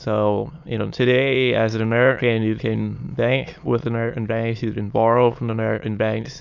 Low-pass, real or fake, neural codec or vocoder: 7.2 kHz; fake; autoencoder, 22.05 kHz, a latent of 192 numbers a frame, VITS, trained on many speakers